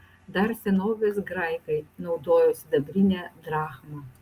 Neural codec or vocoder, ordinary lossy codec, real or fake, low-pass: none; Opus, 32 kbps; real; 14.4 kHz